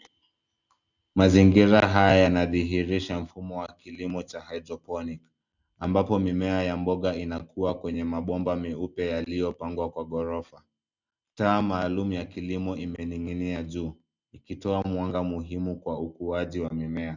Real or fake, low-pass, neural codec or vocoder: real; 7.2 kHz; none